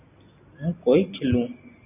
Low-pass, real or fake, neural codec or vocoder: 3.6 kHz; real; none